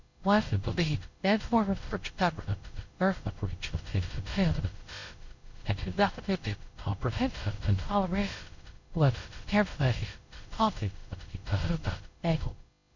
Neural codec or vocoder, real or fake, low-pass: codec, 16 kHz, 0.5 kbps, X-Codec, WavLM features, trained on Multilingual LibriSpeech; fake; 7.2 kHz